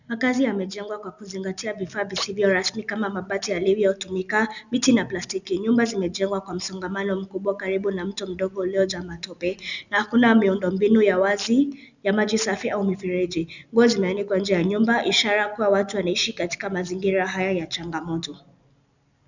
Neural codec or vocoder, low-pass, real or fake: none; 7.2 kHz; real